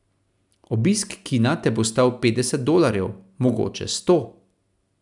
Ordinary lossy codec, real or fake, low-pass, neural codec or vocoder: none; real; 10.8 kHz; none